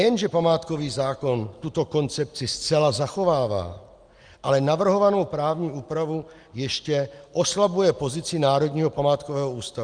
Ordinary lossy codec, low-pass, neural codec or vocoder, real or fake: Opus, 32 kbps; 9.9 kHz; none; real